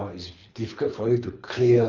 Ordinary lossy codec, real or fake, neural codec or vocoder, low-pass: none; fake; codec, 24 kHz, 3 kbps, HILCodec; 7.2 kHz